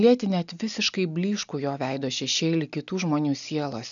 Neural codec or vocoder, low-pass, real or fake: none; 7.2 kHz; real